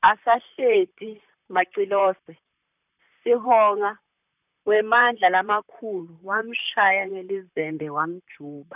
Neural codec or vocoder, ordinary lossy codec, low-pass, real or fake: vocoder, 44.1 kHz, 128 mel bands, Pupu-Vocoder; none; 3.6 kHz; fake